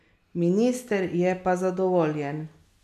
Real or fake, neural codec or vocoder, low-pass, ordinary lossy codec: real; none; 14.4 kHz; none